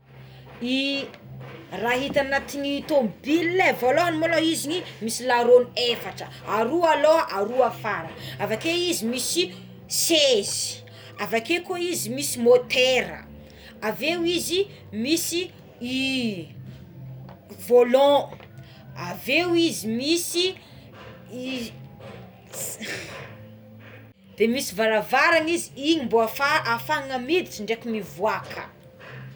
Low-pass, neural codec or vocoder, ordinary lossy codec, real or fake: none; none; none; real